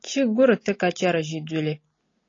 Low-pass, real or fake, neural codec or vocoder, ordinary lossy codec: 7.2 kHz; real; none; AAC, 32 kbps